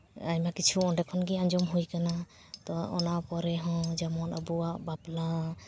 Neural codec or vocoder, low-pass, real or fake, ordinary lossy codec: none; none; real; none